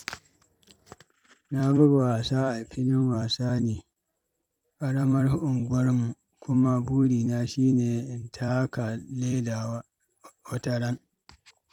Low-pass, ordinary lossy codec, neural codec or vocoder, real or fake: 19.8 kHz; none; vocoder, 44.1 kHz, 128 mel bands every 256 samples, BigVGAN v2; fake